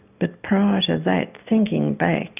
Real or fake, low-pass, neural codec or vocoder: real; 3.6 kHz; none